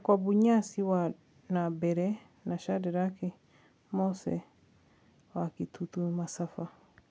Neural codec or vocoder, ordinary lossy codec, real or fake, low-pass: none; none; real; none